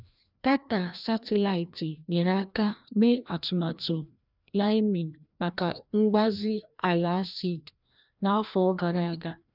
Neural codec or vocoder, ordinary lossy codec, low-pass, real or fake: codec, 16 kHz, 1 kbps, FreqCodec, larger model; none; 5.4 kHz; fake